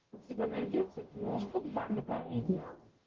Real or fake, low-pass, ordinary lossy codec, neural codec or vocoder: fake; 7.2 kHz; Opus, 16 kbps; codec, 44.1 kHz, 0.9 kbps, DAC